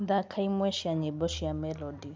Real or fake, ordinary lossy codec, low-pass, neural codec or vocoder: real; none; none; none